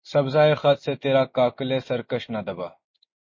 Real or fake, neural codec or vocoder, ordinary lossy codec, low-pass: real; none; MP3, 32 kbps; 7.2 kHz